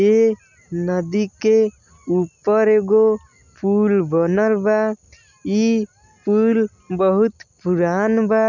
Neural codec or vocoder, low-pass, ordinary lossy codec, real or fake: none; 7.2 kHz; none; real